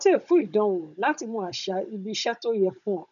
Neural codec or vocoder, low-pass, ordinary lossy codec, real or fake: codec, 16 kHz, 16 kbps, FunCodec, trained on Chinese and English, 50 frames a second; 7.2 kHz; none; fake